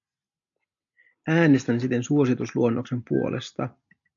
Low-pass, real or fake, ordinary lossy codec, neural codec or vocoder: 7.2 kHz; real; AAC, 64 kbps; none